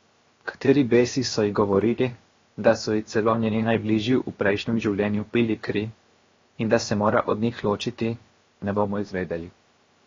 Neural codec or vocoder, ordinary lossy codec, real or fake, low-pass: codec, 16 kHz, 0.7 kbps, FocalCodec; AAC, 32 kbps; fake; 7.2 kHz